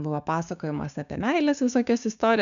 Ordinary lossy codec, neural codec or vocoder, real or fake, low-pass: MP3, 96 kbps; codec, 16 kHz, 6 kbps, DAC; fake; 7.2 kHz